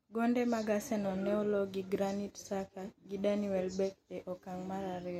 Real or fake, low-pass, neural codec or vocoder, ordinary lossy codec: real; 19.8 kHz; none; MP3, 64 kbps